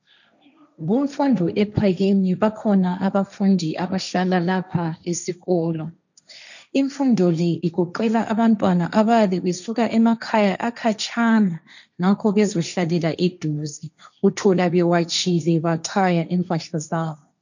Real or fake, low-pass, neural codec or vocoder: fake; 7.2 kHz; codec, 16 kHz, 1.1 kbps, Voila-Tokenizer